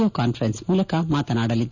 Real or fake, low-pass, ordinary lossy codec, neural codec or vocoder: real; 7.2 kHz; none; none